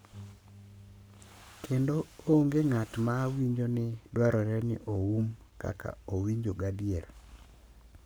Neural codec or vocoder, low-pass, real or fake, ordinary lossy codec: codec, 44.1 kHz, 7.8 kbps, Pupu-Codec; none; fake; none